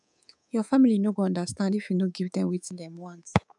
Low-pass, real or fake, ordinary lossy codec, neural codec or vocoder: 10.8 kHz; fake; none; codec, 24 kHz, 3.1 kbps, DualCodec